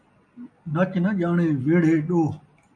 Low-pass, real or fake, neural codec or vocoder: 9.9 kHz; real; none